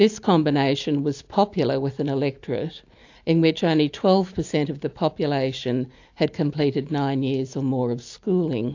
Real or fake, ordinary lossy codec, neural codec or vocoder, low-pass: real; AAC, 48 kbps; none; 7.2 kHz